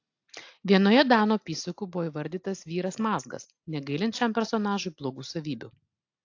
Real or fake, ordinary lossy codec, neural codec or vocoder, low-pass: real; AAC, 48 kbps; none; 7.2 kHz